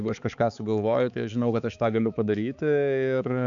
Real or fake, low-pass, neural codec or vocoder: fake; 7.2 kHz; codec, 16 kHz, 4 kbps, X-Codec, HuBERT features, trained on balanced general audio